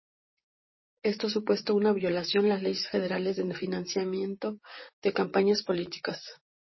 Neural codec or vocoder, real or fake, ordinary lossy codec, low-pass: vocoder, 44.1 kHz, 128 mel bands, Pupu-Vocoder; fake; MP3, 24 kbps; 7.2 kHz